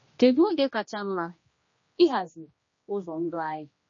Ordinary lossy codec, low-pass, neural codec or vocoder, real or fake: MP3, 32 kbps; 7.2 kHz; codec, 16 kHz, 1 kbps, X-Codec, HuBERT features, trained on general audio; fake